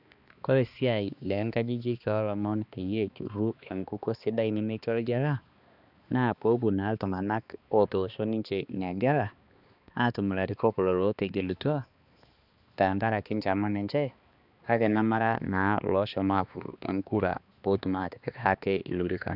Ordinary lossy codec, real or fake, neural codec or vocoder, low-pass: none; fake; codec, 16 kHz, 2 kbps, X-Codec, HuBERT features, trained on balanced general audio; 5.4 kHz